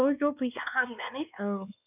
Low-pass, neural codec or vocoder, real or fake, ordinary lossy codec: 3.6 kHz; codec, 16 kHz, 2 kbps, X-Codec, WavLM features, trained on Multilingual LibriSpeech; fake; none